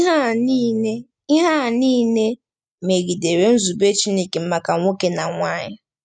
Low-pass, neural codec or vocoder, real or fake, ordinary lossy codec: 9.9 kHz; none; real; none